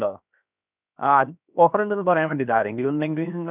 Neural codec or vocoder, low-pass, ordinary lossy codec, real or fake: codec, 16 kHz, 0.7 kbps, FocalCodec; 3.6 kHz; none; fake